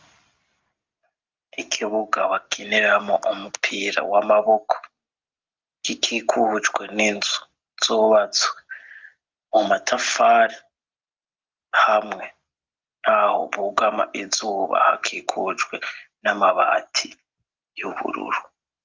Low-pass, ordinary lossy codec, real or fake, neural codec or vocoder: 7.2 kHz; Opus, 24 kbps; real; none